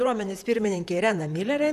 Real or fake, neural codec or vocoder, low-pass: fake; vocoder, 44.1 kHz, 128 mel bands, Pupu-Vocoder; 14.4 kHz